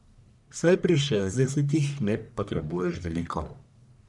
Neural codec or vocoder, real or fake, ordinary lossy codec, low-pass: codec, 44.1 kHz, 1.7 kbps, Pupu-Codec; fake; none; 10.8 kHz